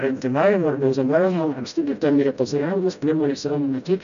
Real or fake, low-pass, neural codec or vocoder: fake; 7.2 kHz; codec, 16 kHz, 0.5 kbps, FreqCodec, smaller model